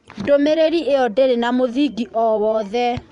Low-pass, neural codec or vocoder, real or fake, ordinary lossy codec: 10.8 kHz; vocoder, 24 kHz, 100 mel bands, Vocos; fake; none